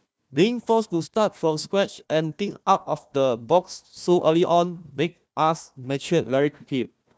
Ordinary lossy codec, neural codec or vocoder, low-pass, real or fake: none; codec, 16 kHz, 1 kbps, FunCodec, trained on Chinese and English, 50 frames a second; none; fake